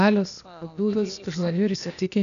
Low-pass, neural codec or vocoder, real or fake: 7.2 kHz; codec, 16 kHz, 0.8 kbps, ZipCodec; fake